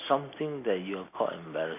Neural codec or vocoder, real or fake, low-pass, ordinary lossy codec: none; real; 3.6 kHz; MP3, 24 kbps